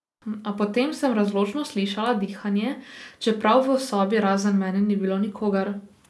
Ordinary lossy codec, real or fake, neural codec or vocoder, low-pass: none; real; none; none